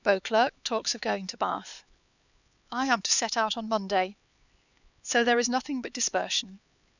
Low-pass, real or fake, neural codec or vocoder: 7.2 kHz; fake; codec, 16 kHz, 4 kbps, X-Codec, HuBERT features, trained on LibriSpeech